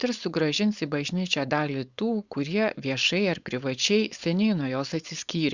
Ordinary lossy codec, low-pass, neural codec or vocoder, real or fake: Opus, 64 kbps; 7.2 kHz; codec, 16 kHz, 4.8 kbps, FACodec; fake